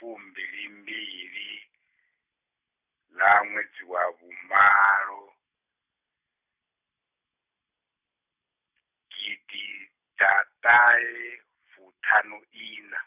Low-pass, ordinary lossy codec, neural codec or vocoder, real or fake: 3.6 kHz; none; none; real